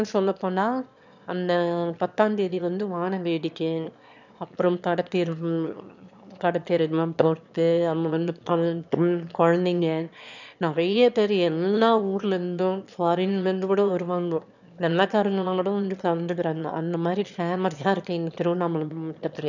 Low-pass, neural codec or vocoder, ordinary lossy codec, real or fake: 7.2 kHz; autoencoder, 22.05 kHz, a latent of 192 numbers a frame, VITS, trained on one speaker; none; fake